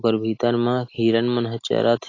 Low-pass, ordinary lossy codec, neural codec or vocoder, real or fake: 7.2 kHz; AAC, 32 kbps; none; real